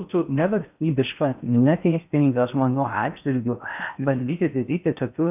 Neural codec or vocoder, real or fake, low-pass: codec, 16 kHz in and 24 kHz out, 0.6 kbps, FocalCodec, streaming, 4096 codes; fake; 3.6 kHz